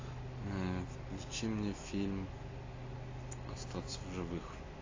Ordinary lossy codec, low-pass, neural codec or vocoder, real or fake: AAC, 32 kbps; 7.2 kHz; none; real